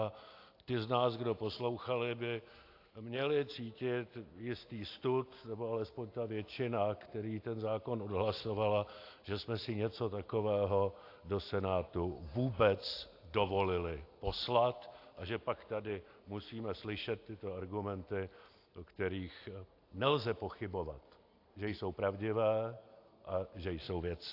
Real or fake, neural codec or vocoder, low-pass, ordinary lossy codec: real; none; 5.4 kHz; AAC, 32 kbps